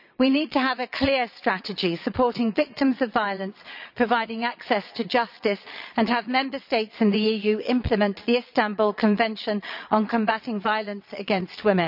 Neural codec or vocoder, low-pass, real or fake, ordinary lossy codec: vocoder, 22.05 kHz, 80 mel bands, Vocos; 5.4 kHz; fake; none